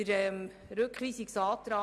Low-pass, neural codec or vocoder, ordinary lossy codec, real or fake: none; none; none; real